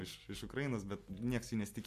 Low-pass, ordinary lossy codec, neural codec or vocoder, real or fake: 14.4 kHz; MP3, 64 kbps; none; real